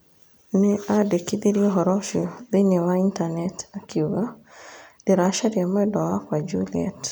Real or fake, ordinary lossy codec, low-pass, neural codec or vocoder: real; none; none; none